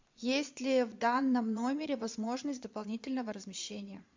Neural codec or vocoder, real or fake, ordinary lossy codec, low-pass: vocoder, 22.05 kHz, 80 mel bands, Vocos; fake; MP3, 64 kbps; 7.2 kHz